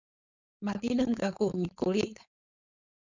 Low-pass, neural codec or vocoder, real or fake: 7.2 kHz; codec, 16 kHz, 4.8 kbps, FACodec; fake